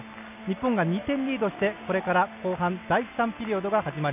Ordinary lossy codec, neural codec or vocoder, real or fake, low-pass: none; none; real; 3.6 kHz